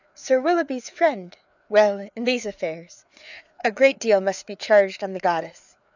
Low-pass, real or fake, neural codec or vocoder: 7.2 kHz; fake; codec, 16 kHz, 4 kbps, FreqCodec, larger model